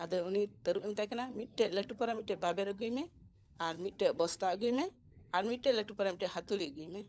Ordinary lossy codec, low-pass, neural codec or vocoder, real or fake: none; none; codec, 16 kHz, 16 kbps, FunCodec, trained on LibriTTS, 50 frames a second; fake